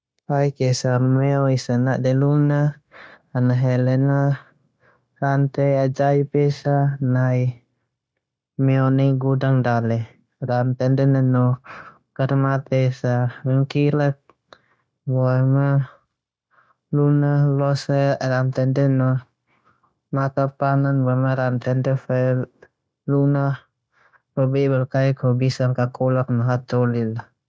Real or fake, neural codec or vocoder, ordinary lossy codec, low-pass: fake; codec, 16 kHz, 0.9 kbps, LongCat-Audio-Codec; none; none